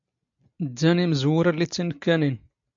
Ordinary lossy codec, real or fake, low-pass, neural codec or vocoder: MP3, 64 kbps; real; 7.2 kHz; none